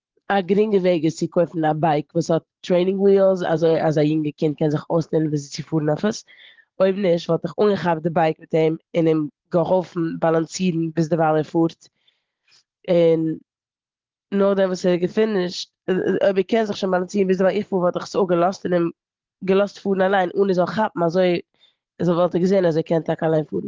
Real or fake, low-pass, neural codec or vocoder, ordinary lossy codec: fake; 7.2 kHz; vocoder, 44.1 kHz, 128 mel bands every 512 samples, BigVGAN v2; Opus, 16 kbps